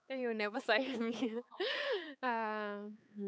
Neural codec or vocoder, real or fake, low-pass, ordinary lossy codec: codec, 16 kHz, 4 kbps, X-Codec, HuBERT features, trained on balanced general audio; fake; none; none